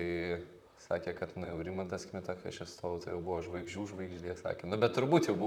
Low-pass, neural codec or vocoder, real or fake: 19.8 kHz; vocoder, 44.1 kHz, 128 mel bands, Pupu-Vocoder; fake